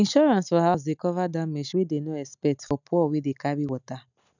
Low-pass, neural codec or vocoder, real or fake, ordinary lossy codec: 7.2 kHz; none; real; none